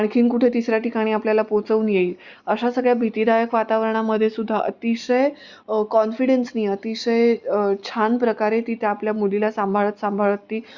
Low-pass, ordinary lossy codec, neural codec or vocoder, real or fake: 7.2 kHz; Opus, 64 kbps; none; real